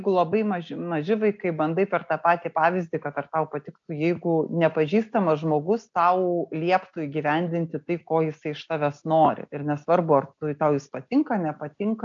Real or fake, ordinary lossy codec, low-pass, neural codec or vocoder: real; AAC, 64 kbps; 7.2 kHz; none